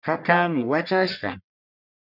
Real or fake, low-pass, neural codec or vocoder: fake; 5.4 kHz; codec, 44.1 kHz, 1.7 kbps, Pupu-Codec